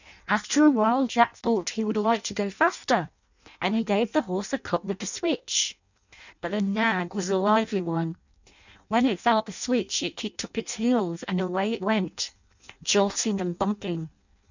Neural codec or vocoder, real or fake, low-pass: codec, 16 kHz in and 24 kHz out, 0.6 kbps, FireRedTTS-2 codec; fake; 7.2 kHz